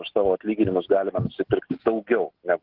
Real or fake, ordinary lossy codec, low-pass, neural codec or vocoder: real; Opus, 16 kbps; 5.4 kHz; none